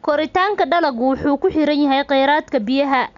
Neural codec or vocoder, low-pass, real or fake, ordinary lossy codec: none; 7.2 kHz; real; none